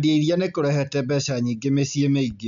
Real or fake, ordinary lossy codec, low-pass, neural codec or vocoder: real; none; 7.2 kHz; none